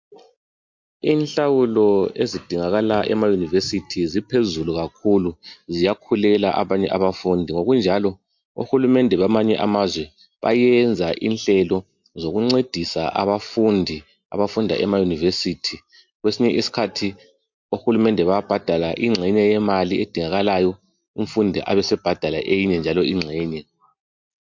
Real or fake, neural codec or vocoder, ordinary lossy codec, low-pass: real; none; MP3, 48 kbps; 7.2 kHz